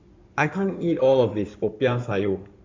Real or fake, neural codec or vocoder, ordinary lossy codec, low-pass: fake; codec, 16 kHz in and 24 kHz out, 2.2 kbps, FireRedTTS-2 codec; MP3, 64 kbps; 7.2 kHz